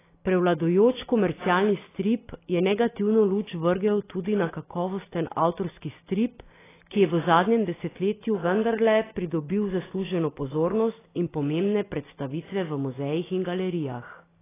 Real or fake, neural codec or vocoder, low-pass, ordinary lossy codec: real; none; 3.6 kHz; AAC, 16 kbps